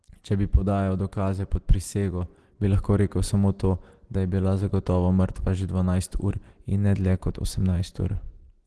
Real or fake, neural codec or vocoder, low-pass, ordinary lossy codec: real; none; 10.8 kHz; Opus, 16 kbps